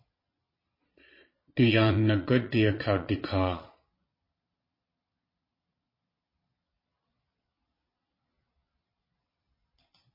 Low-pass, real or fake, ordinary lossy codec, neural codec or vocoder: 5.4 kHz; fake; MP3, 24 kbps; vocoder, 22.05 kHz, 80 mel bands, Vocos